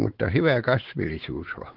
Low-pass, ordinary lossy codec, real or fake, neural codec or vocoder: 5.4 kHz; Opus, 16 kbps; fake; codec, 16 kHz, 4 kbps, X-Codec, WavLM features, trained on Multilingual LibriSpeech